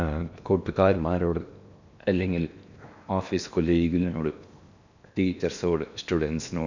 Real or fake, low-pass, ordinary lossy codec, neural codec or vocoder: fake; 7.2 kHz; none; codec, 16 kHz in and 24 kHz out, 0.8 kbps, FocalCodec, streaming, 65536 codes